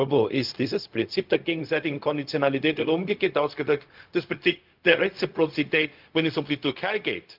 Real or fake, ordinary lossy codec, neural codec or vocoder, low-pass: fake; Opus, 32 kbps; codec, 16 kHz, 0.4 kbps, LongCat-Audio-Codec; 5.4 kHz